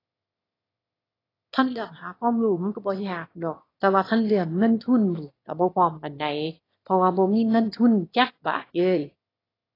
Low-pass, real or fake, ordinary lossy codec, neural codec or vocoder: 5.4 kHz; fake; AAC, 24 kbps; autoencoder, 22.05 kHz, a latent of 192 numbers a frame, VITS, trained on one speaker